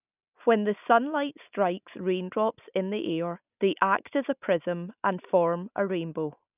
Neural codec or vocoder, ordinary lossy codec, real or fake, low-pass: none; none; real; 3.6 kHz